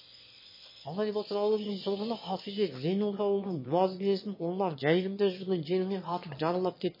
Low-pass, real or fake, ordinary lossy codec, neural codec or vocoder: 5.4 kHz; fake; MP3, 24 kbps; autoencoder, 22.05 kHz, a latent of 192 numbers a frame, VITS, trained on one speaker